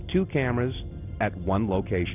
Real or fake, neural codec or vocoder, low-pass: real; none; 3.6 kHz